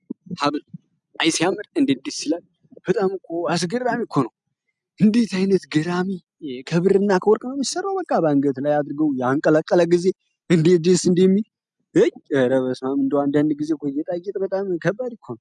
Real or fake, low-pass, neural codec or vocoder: real; 10.8 kHz; none